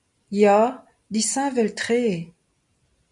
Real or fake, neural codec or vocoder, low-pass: real; none; 10.8 kHz